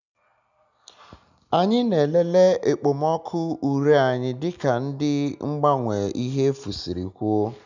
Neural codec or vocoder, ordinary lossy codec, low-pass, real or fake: none; none; 7.2 kHz; real